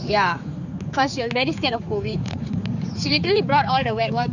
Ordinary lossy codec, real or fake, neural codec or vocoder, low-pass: none; fake; codec, 16 kHz, 4 kbps, X-Codec, HuBERT features, trained on general audio; 7.2 kHz